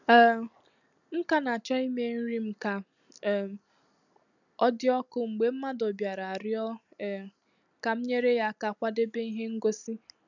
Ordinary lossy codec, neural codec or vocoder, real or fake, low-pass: none; none; real; 7.2 kHz